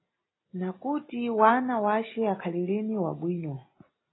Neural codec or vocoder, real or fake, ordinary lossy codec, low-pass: none; real; AAC, 16 kbps; 7.2 kHz